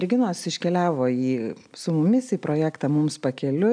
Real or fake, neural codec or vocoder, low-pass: real; none; 9.9 kHz